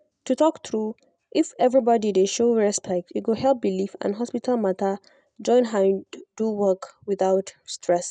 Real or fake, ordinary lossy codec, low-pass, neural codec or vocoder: real; none; 9.9 kHz; none